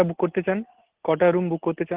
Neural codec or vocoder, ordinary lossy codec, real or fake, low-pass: none; Opus, 16 kbps; real; 3.6 kHz